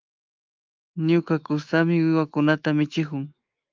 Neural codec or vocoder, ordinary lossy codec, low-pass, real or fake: autoencoder, 48 kHz, 128 numbers a frame, DAC-VAE, trained on Japanese speech; Opus, 24 kbps; 7.2 kHz; fake